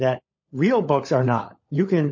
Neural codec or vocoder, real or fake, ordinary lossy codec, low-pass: codec, 16 kHz, 16 kbps, FunCodec, trained on LibriTTS, 50 frames a second; fake; MP3, 32 kbps; 7.2 kHz